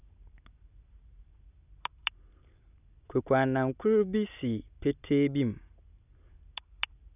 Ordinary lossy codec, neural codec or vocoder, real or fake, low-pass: none; none; real; 3.6 kHz